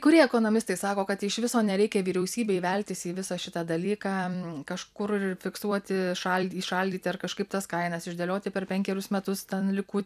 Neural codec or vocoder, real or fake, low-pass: vocoder, 44.1 kHz, 128 mel bands every 256 samples, BigVGAN v2; fake; 14.4 kHz